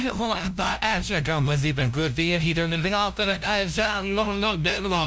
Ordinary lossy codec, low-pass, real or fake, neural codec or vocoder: none; none; fake; codec, 16 kHz, 0.5 kbps, FunCodec, trained on LibriTTS, 25 frames a second